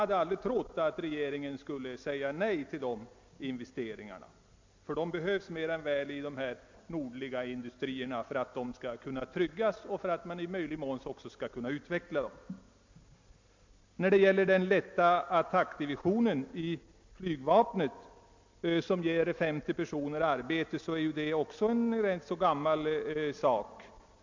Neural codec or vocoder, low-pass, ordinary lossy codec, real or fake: none; 7.2 kHz; MP3, 48 kbps; real